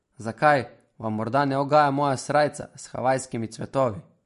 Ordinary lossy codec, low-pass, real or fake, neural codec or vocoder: MP3, 48 kbps; 14.4 kHz; real; none